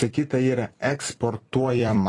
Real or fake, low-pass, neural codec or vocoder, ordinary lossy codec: fake; 10.8 kHz; vocoder, 44.1 kHz, 128 mel bands every 256 samples, BigVGAN v2; AAC, 32 kbps